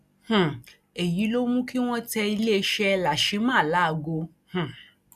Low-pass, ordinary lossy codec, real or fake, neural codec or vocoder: 14.4 kHz; none; real; none